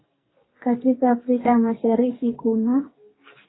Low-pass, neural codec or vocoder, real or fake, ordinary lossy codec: 7.2 kHz; codec, 44.1 kHz, 2.6 kbps, SNAC; fake; AAC, 16 kbps